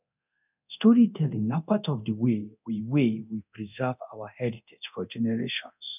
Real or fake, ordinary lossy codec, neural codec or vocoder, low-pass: fake; none; codec, 24 kHz, 0.9 kbps, DualCodec; 3.6 kHz